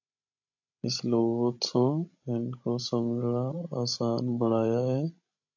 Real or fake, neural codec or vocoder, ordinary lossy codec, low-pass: fake; codec, 16 kHz, 16 kbps, FreqCodec, larger model; AAC, 48 kbps; 7.2 kHz